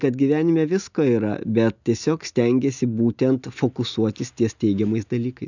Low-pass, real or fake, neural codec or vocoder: 7.2 kHz; real; none